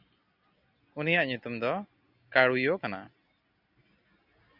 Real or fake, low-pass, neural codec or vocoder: real; 5.4 kHz; none